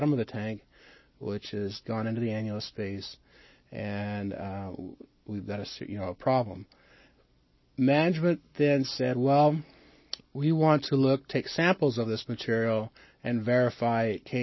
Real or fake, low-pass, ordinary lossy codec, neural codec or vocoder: real; 7.2 kHz; MP3, 24 kbps; none